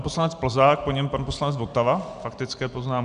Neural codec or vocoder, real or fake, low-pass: none; real; 9.9 kHz